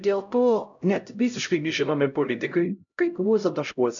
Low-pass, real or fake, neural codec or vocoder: 7.2 kHz; fake; codec, 16 kHz, 0.5 kbps, X-Codec, HuBERT features, trained on LibriSpeech